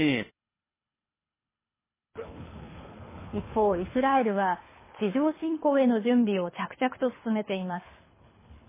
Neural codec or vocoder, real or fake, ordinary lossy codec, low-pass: codec, 24 kHz, 3 kbps, HILCodec; fake; MP3, 16 kbps; 3.6 kHz